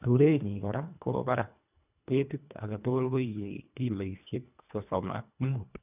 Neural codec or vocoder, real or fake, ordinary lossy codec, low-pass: codec, 24 kHz, 1.5 kbps, HILCodec; fake; none; 3.6 kHz